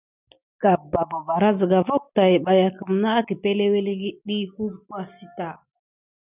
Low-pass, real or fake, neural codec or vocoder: 3.6 kHz; real; none